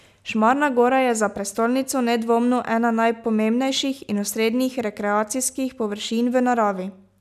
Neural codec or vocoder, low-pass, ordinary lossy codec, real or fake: none; 14.4 kHz; none; real